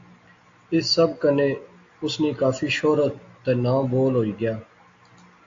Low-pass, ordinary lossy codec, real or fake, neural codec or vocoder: 7.2 kHz; AAC, 48 kbps; real; none